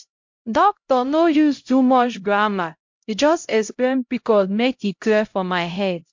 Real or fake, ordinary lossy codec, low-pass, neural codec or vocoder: fake; MP3, 48 kbps; 7.2 kHz; codec, 16 kHz, 0.5 kbps, X-Codec, HuBERT features, trained on LibriSpeech